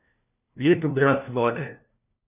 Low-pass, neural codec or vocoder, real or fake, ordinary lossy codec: 3.6 kHz; codec, 16 kHz, 1 kbps, FunCodec, trained on LibriTTS, 50 frames a second; fake; none